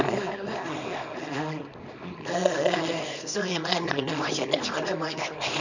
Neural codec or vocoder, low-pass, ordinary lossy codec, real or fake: codec, 24 kHz, 0.9 kbps, WavTokenizer, small release; 7.2 kHz; none; fake